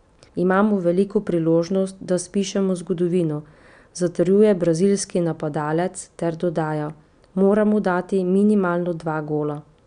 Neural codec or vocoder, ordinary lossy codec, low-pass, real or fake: none; none; 9.9 kHz; real